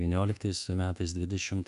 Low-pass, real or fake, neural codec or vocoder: 10.8 kHz; fake; codec, 24 kHz, 1.2 kbps, DualCodec